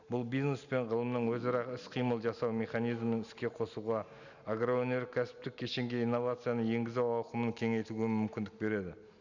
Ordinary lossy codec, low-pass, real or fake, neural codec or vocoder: none; 7.2 kHz; real; none